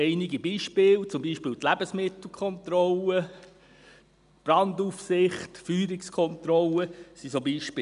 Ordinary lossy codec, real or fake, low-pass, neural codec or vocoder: none; real; 10.8 kHz; none